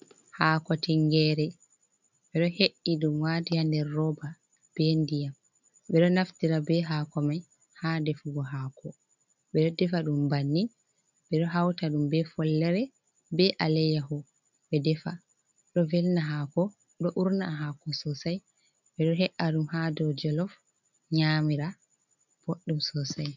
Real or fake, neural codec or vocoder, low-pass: real; none; 7.2 kHz